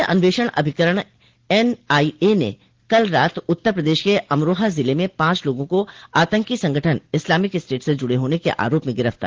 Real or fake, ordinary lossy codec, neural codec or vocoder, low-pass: real; Opus, 16 kbps; none; 7.2 kHz